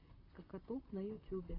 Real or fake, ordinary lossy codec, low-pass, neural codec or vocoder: fake; AAC, 24 kbps; 5.4 kHz; vocoder, 44.1 kHz, 128 mel bands, Pupu-Vocoder